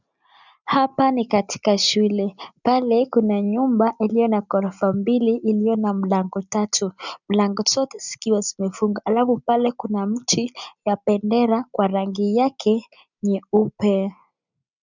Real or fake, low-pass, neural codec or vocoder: real; 7.2 kHz; none